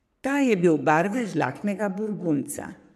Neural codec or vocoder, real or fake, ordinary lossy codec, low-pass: codec, 44.1 kHz, 3.4 kbps, Pupu-Codec; fake; none; 14.4 kHz